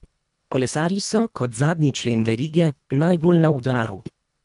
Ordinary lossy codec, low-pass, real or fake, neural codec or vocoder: none; 10.8 kHz; fake; codec, 24 kHz, 1.5 kbps, HILCodec